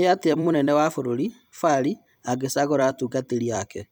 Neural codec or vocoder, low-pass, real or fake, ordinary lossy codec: vocoder, 44.1 kHz, 128 mel bands every 256 samples, BigVGAN v2; none; fake; none